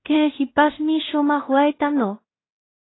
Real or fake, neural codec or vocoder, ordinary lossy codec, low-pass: fake; codec, 16 kHz in and 24 kHz out, 0.4 kbps, LongCat-Audio-Codec, two codebook decoder; AAC, 16 kbps; 7.2 kHz